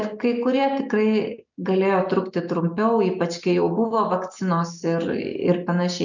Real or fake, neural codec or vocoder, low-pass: real; none; 7.2 kHz